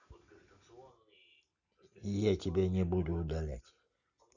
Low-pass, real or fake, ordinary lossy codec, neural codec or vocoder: 7.2 kHz; real; none; none